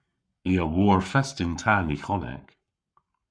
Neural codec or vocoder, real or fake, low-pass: codec, 44.1 kHz, 7.8 kbps, Pupu-Codec; fake; 9.9 kHz